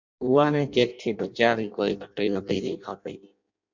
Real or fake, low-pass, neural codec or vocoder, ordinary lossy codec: fake; 7.2 kHz; codec, 16 kHz in and 24 kHz out, 0.6 kbps, FireRedTTS-2 codec; MP3, 64 kbps